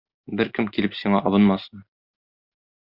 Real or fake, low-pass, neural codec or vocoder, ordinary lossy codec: real; 5.4 kHz; none; Opus, 64 kbps